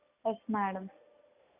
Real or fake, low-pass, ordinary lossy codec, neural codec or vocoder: real; 3.6 kHz; none; none